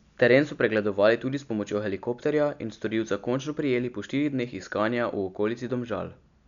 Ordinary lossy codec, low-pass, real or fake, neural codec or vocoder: none; 7.2 kHz; real; none